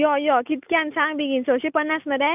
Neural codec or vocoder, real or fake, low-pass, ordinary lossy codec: none; real; 3.6 kHz; none